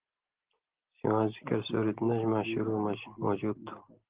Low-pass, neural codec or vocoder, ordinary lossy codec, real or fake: 3.6 kHz; none; Opus, 16 kbps; real